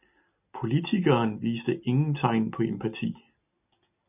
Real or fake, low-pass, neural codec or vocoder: real; 3.6 kHz; none